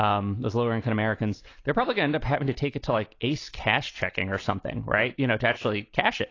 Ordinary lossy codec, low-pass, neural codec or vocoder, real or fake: AAC, 32 kbps; 7.2 kHz; none; real